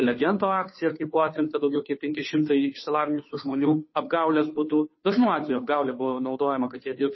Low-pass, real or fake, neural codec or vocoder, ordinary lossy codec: 7.2 kHz; fake; codec, 16 kHz, 2 kbps, FunCodec, trained on Chinese and English, 25 frames a second; MP3, 24 kbps